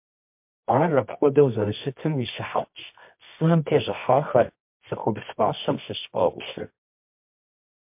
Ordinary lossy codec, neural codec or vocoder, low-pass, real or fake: MP3, 32 kbps; codec, 24 kHz, 0.9 kbps, WavTokenizer, medium music audio release; 3.6 kHz; fake